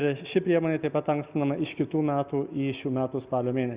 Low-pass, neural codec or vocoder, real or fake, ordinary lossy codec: 3.6 kHz; none; real; Opus, 64 kbps